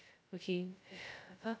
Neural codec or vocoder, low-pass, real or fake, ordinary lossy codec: codec, 16 kHz, 0.2 kbps, FocalCodec; none; fake; none